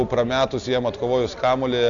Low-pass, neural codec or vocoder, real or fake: 7.2 kHz; none; real